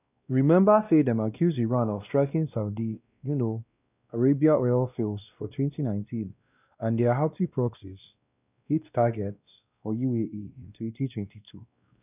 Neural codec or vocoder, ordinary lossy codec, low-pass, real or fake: codec, 16 kHz, 1 kbps, X-Codec, WavLM features, trained on Multilingual LibriSpeech; none; 3.6 kHz; fake